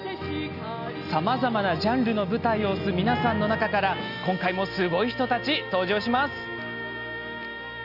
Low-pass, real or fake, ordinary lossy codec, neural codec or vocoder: 5.4 kHz; real; none; none